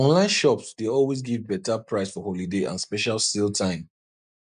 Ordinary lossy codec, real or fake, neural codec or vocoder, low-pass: MP3, 96 kbps; real; none; 9.9 kHz